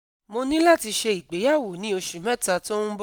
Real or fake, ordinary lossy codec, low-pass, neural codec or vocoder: real; none; none; none